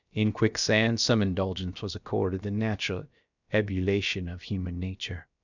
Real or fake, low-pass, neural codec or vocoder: fake; 7.2 kHz; codec, 16 kHz, about 1 kbps, DyCAST, with the encoder's durations